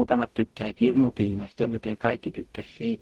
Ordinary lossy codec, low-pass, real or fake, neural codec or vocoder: Opus, 16 kbps; 19.8 kHz; fake; codec, 44.1 kHz, 0.9 kbps, DAC